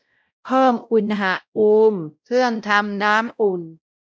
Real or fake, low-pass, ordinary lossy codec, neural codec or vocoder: fake; none; none; codec, 16 kHz, 0.5 kbps, X-Codec, WavLM features, trained on Multilingual LibriSpeech